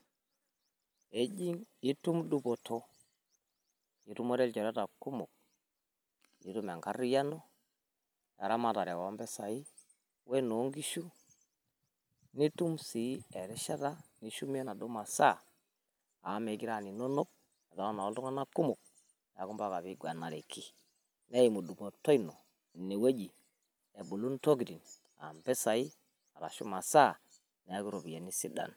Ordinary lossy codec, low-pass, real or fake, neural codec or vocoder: none; none; real; none